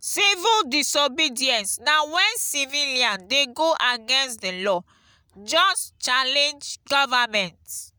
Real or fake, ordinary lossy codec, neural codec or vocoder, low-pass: real; none; none; none